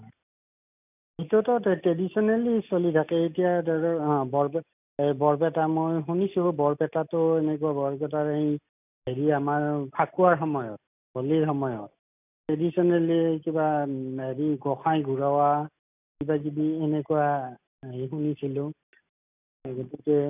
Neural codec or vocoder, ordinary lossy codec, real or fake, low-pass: none; none; real; 3.6 kHz